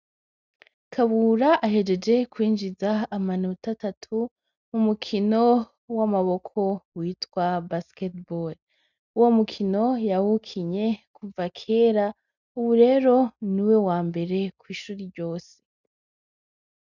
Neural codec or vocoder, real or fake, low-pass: none; real; 7.2 kHz